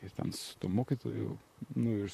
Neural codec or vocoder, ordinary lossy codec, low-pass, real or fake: vocoder, 44.1 kHz, 128 mel bands, Pupu-Vocoder; MP3, 64 kbps; 14.4 kHz; fake